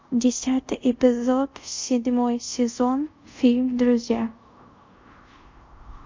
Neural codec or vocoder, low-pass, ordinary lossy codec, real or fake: codec, 24 kHz, 0.5 kbps, DualCodec; 7.2 kHz; MP3, 48 kbps; fake